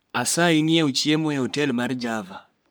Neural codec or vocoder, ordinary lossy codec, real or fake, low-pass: codec, 44.1 kHz, 3.4 kbps, Pupu-Codec; none; fake; none